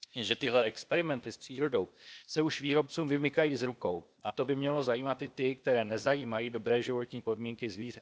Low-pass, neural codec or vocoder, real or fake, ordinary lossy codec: none; codec, 16 kHz, 0.8 kbps, ZipCodec; fake; none